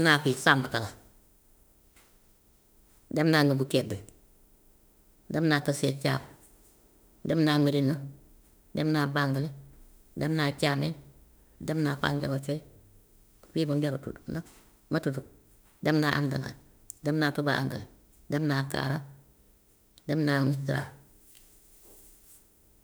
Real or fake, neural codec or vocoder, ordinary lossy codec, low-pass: fake; autoencoder, 48 kHz, 32 numbers a frame, DAC-VAE, trained on Japanese speech; none; none